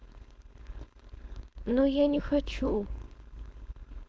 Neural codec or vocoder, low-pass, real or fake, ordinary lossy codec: codec, 16 kHz, 4.8 kbps, FACodec; none; fake; none